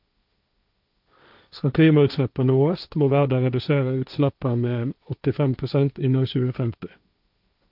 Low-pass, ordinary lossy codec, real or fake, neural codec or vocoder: 5.4 kHz; none; fake; codec, 16 kHz, 1.1 kbps, Voila-Tokenizer